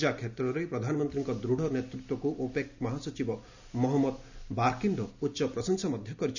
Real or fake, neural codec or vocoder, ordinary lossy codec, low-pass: real; none; none; 7.2 kHz